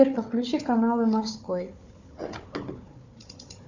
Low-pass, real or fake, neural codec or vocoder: 7.2 kHz; fake; codec, 16 kHz, 4 kbps, FunCodec, trained on Chinese and English, 50 frames a second